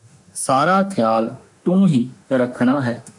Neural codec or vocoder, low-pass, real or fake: autoencoder, 48 kHz, 32 numbers a frame, DAC-VAE, trained on Japanese speech; 10.8 kHz; fake